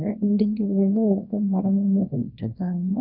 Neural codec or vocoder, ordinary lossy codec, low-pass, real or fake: codec, 24 kHz, 0.9 kbps, WavTokenizer, small release; none; 5.4 kHz; fake